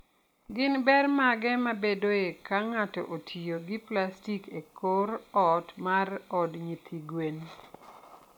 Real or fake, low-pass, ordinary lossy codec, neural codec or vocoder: real; 19.8 kHz; MP3, 96 kbps; none